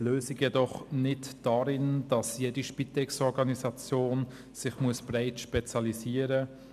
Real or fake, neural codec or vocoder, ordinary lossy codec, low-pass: real; none; none; 14.4 kHz